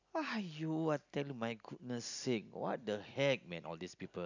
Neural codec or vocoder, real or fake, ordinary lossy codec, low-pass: none; real; none; 7.2 kHz